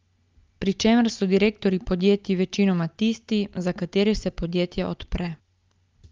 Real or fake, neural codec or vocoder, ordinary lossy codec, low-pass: real; none; Opus, 32 kbps; 7.2 kHz